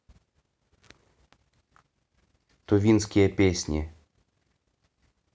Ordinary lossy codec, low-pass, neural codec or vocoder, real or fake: none; none; none; real